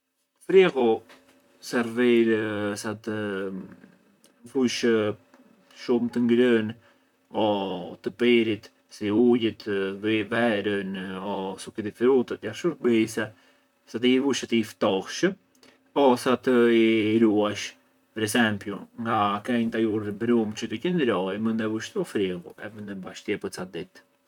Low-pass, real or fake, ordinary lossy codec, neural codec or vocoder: 19.8 kHz; fake; none; vocoder, 44.1 kHz, 128 mel bands, Pupu-Vocoder